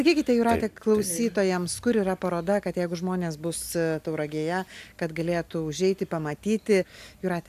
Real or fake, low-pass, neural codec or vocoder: real; 14.4 kHz; none